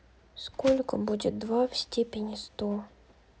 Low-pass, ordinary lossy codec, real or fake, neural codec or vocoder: none; none; real; none